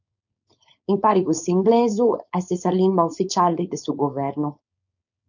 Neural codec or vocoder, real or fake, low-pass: codec, 16 kHz, 4.8 kbps, FACodec; fake; 7.2 kHz